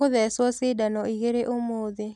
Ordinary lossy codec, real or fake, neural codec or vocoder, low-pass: none; real; none; none